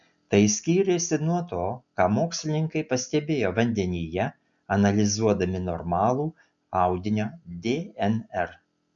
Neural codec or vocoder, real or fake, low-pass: none; real; 7.2 kHz